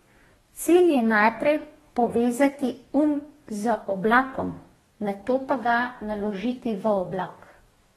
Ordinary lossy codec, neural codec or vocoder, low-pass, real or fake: AAC, 32 kbps; codec, 44.1 kHz, 2.6 kbps, DAC; 19.8 kHz; fake